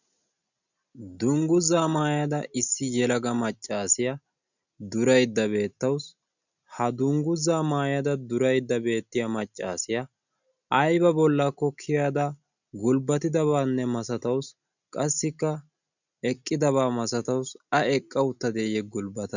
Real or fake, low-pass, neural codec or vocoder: real; 7.2 kHz; none